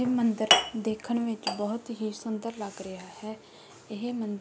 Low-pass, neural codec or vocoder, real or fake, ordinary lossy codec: none; none; real; none